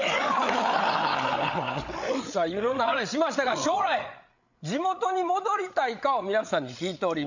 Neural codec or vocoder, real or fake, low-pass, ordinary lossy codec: codec, 16 kHz, 8 kbps, FreqCodec, larger model; fake; 7.2 kHz; none